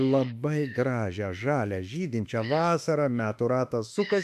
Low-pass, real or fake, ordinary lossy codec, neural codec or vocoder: 14.4 kHz; fake; MP3, 96 kbps; autoencoder, 48 kHz, 32 numbers a frame, DAC-VAE, trained on Japanese speech